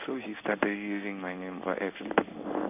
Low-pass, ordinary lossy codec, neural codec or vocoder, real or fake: 3.6 kHz; none; codec, 16 kHz in and 24 kHz out, 1 kbps, XY-Tokenizer; fake